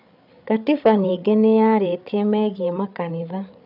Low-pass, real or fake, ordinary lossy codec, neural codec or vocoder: 5.4 kHz; fake; none; codec, 16 kHz, 8 kbps, FreqCodec, larger model